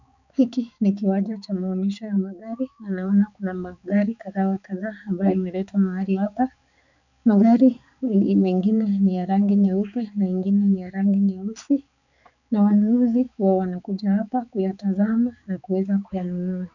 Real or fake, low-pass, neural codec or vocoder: fake; 7.2 kHz; codec, 16 kHz, 4 kbps, X-Codec, HuBERT features, trained on balanced general audio